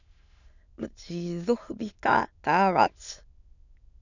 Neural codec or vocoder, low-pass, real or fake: autoencoder, 22.05 kHz, a latent of 192 numbers a frame, VITS, trained on many speakers; 7.2 kHz; fake